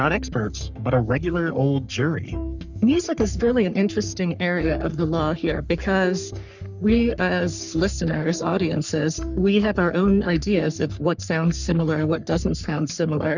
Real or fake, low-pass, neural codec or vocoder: fake; 7.2 kHz; codec, 44.1 kHz, 3.4 kbps, Pupu-Codec